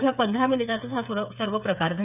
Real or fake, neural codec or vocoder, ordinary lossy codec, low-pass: fake; codec, 16 kHz, 8 kbps, FreqCodec, smaller model; none; 3.6 kHz